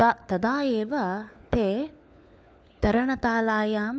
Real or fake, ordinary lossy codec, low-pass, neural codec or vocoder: fake; none; none; codec, 16 kHz, 4 kbps, FunCodec, trained on LibriTTS, 50 frames a second